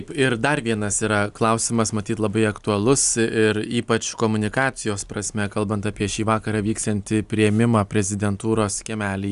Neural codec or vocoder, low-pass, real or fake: none; 10.8 kHz; real